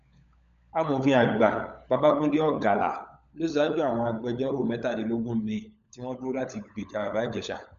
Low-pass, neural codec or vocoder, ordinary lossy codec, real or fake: 7.2 kHz; codec, 16 kHz, 16 kbps, FunCodec, trained on LibriTTS, 50 frames a second; none; fake